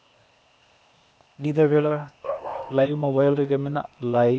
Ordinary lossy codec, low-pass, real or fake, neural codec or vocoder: none; none; fake; codec, 16 kHz, 0.8 kbps, ZipCodec